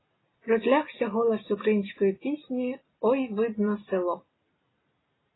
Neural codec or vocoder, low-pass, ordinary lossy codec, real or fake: none; 7.2 kHz; AAC, 16 kbps; real